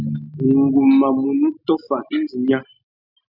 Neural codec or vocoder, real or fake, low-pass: none; real; 5.4 kHz